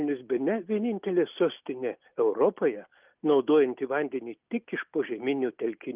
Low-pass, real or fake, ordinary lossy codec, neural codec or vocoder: 3.6 kHz; real; Opus, 24 kbps; none